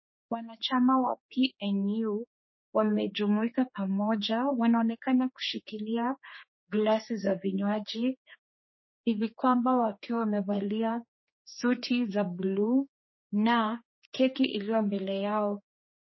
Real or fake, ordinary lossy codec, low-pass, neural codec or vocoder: fake; MP3, 24 kbps; 7.2 kHz; codec, 16 kHz, 4 kbps, X-Codec, HuBERT features, trained on general audio